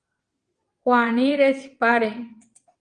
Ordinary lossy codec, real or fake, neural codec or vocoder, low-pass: Opus, 32 kbps; fake; vocoder, 22.05 kHz, 80 mel bands, WaveNeXt; 9.9 kHz